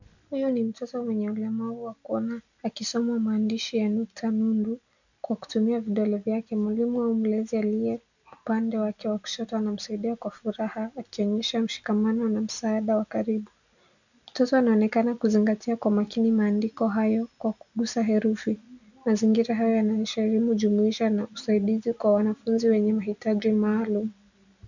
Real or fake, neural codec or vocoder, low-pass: real; none; 7.2 kHz